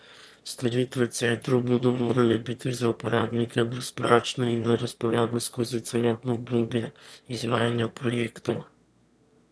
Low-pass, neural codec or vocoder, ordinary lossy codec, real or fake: none; autoencoder, 22.05 kHz, a latent of 192 numbers a frame, VITS, trained on one speaker; none; fake